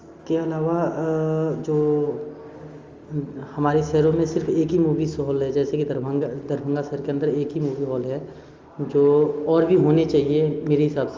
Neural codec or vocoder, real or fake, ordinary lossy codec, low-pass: none; real; Opus, 32 kbps; 7.2 kHz